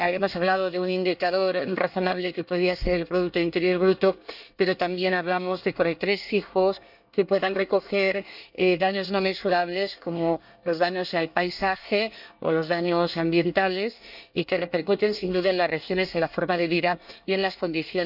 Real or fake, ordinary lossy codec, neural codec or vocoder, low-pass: fake; none; codec, 24 kHz, 1 kbps, SNAC; 5.4 kHz